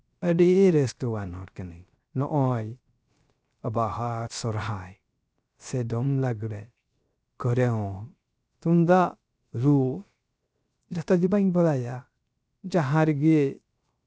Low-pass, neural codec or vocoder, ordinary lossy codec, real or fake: none; codec, 16 kHz, 0.3 kbps, FocalCodec; none; fake